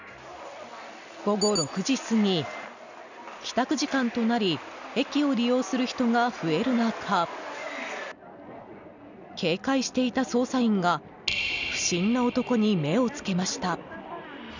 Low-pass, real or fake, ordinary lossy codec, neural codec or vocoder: 7.2 kHz; real; none; none